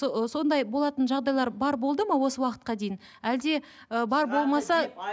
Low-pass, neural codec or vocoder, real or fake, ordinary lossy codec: none; none; real; none